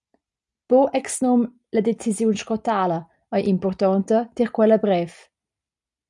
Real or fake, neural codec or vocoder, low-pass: real; none; 10.8 kHz